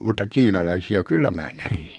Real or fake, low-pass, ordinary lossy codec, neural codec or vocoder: fake; 10.8 kHz; none; codec, 24 kHz, 1 kbps, SNAC